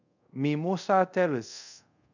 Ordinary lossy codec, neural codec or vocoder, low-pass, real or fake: none; codec, 24 kHz, 0.5 kbps, DualCodec; 7.2 kHz; fake